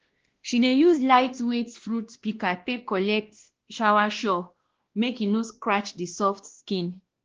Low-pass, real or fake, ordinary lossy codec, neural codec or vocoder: 7.2 kHz; fake; Opus, 16 kbps; codec, 16 kHz, 1 kbps, X-Codec, WavLM features, trained on Multilingual LibriSpeech